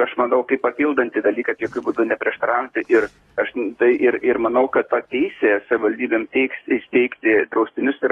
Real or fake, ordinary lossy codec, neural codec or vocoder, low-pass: fake; AAC, 32 kbps; codec, 44.1 kHz, 7.8 kbps, DAC; 19.8 kHz